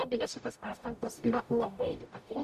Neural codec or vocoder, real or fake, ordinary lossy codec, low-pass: codec, 44.1 kHz, 0.9 kbps, DAC; fake; MP3, 96 kbps; 14.4 kHz